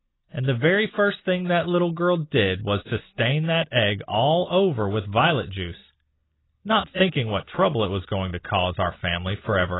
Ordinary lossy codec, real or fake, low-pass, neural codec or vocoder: AAC, 16 kbps; real; 7.2 kHz; none